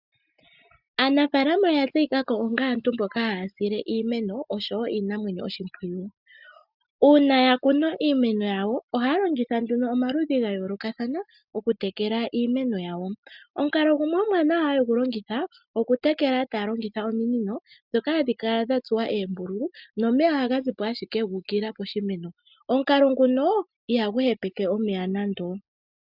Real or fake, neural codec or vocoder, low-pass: real; none; 5.4 kHz